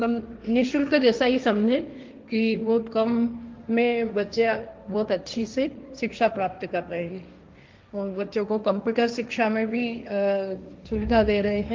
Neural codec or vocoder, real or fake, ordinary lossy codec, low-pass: codec, 16 kHz, 1.1 kbps, Voila-Tokenizer; fake; Opus, 24 kbps; 7.2 kHz